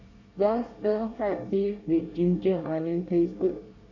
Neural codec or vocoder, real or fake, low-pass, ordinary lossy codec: codec, 24 kHz, 1 kbps, SNAC; fake; 7.2 kHz; none